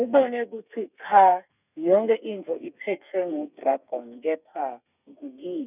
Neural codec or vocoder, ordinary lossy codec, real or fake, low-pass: codec, 32 kHz, 1.9 kbps, SNAC; none; fake; 3.6 kHz